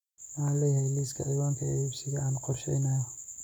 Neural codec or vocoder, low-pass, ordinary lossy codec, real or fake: none; 19.8 kHz; none; real